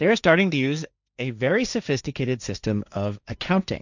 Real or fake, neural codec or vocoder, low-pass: fake; codec, 16 kHz, 1.1 kbps, Voila-Tokenizer; 7.2 kHz